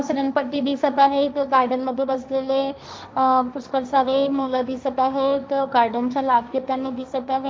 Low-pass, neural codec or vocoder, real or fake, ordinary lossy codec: none; codec, 16 kHz, 1.1 kbps, Voila-Tokenizer; fake; none